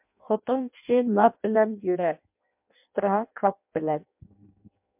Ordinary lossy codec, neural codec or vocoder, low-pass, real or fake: MP3, 32 kbps; codec, 16 kHz in and 24 kHz out, 0.6 kbps, FireRedTTS-2 codec; 3.6 kHz; fake